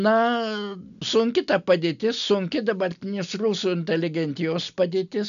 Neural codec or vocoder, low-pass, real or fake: none; 7.2 kHz; real